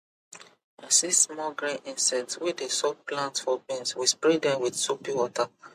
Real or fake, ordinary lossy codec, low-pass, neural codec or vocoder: real; MP3, 64 kbps; 14.4 kHz; none